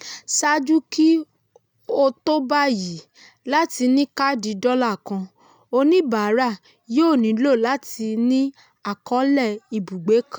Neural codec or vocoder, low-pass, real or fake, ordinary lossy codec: none; 19.8 kHz; real; none